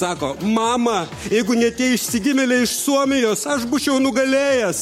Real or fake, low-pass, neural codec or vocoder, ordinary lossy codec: fake; 19.8 kHz; codec, 44.1 kHz, 7.8 kbps, Pupu-Codec; MP3, 64 kbps